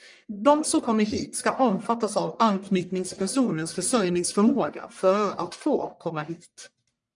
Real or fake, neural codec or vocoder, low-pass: fake; codec, 44.1 kHz, 1.7 kbps, Pupu-Codec; 10.8 kHz